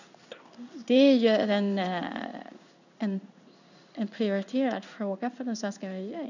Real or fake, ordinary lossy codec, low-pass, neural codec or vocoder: fake; none; 7.2 kHz; codec, 16 kHz in and 24 kHz out, 1 kbps, XY-Tokenizer